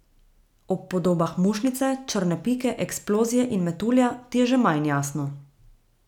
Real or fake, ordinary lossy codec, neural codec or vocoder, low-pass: real; none; none; 19.8 kHz